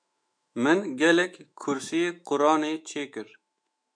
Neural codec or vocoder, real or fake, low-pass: autoencoder, 48 kHz, 128 numbers a frame, DAC-VAE, trained on Japanese speech; fake; 9.9 kHz